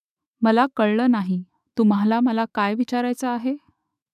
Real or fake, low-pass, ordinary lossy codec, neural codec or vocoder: fake; 14.4 kHz; none; autoencoder, 48 kHz, 128 numbers a frame, DAC-VAE, trained on Japanese speech